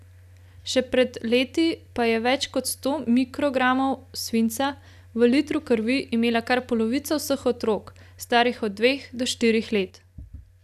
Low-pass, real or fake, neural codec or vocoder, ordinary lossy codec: 14.4 kHz; real; none; none